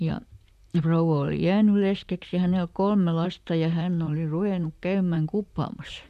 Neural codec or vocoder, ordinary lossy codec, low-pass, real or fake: vocoder, 44.1 kHz, 128 mel bands, Pupu-Vocoder; none; 14.4 kHz; fake